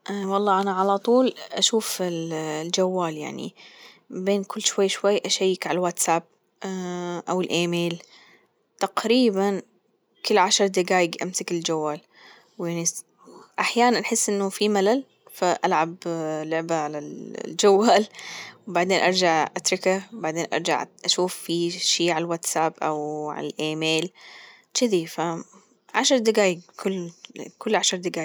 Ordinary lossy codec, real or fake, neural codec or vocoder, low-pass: none; real; none; none